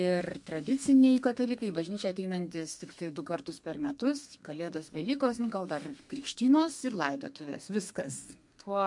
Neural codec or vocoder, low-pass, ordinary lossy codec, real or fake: codec, 32 kHz, 1.9 kbps, SNAC; 10.8 kHz; MP3, 64 kbps; fake